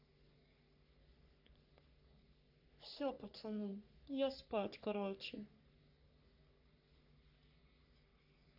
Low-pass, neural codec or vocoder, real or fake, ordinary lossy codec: 5.4 kHz; codec, 44.1 kHz, 3.4 kbps, Pupu-Codec; fake; none